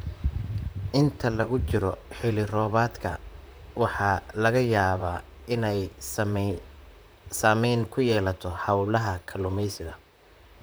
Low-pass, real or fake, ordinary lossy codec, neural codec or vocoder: none; fake; none; vocoder, 44.1 kHz, 128 mel bands, Pupu-Vocoder